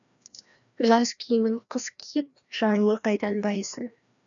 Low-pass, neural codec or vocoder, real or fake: 7.2 kHz; codec, 16 kHz, 1 kbps, FreqCodec, larger model; fake